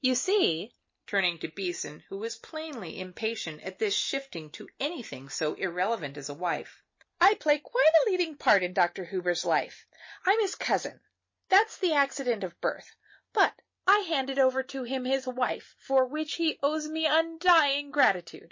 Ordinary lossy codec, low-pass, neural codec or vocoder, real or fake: MP3, 32 kbps; 7.2 kHz; none; real